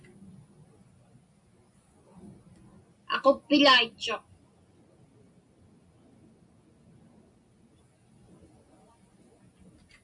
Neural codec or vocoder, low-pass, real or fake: none; 10.8 kHz; real